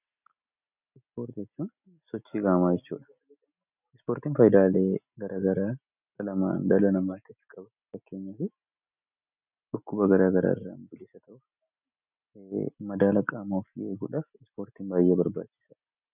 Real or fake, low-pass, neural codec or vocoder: real; 3.6 kHz; none